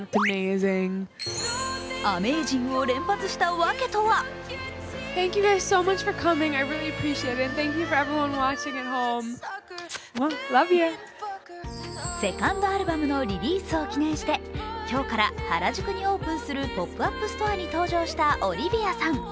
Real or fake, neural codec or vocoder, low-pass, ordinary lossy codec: real; none; none; none